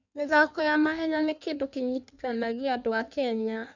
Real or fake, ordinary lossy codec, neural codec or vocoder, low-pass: fake; none; codec, 16 kHz in and 24 kHz out, 1.1 kbps, FireRedTTS-2 codec; 7.2 kHz